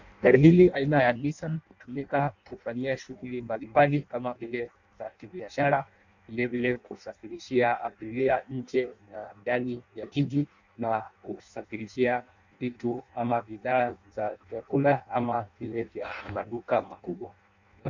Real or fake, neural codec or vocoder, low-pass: fake; codec, 16 kHz in and 24 kHz out, 0.6 kbps, FireRedTTS-2 codec; 7.2 kHz